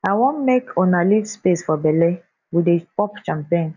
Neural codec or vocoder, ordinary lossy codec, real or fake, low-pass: none; none; real; 7.2 kHz